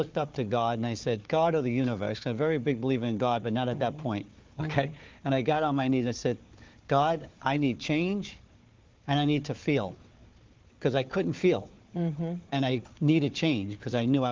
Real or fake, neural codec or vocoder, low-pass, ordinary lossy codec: fake; codec, 16 kHz, 4 kbps, FunCodec, trained on Chinese and English, 50 frames a second; 7.2 kHz; Opus, 32 kbps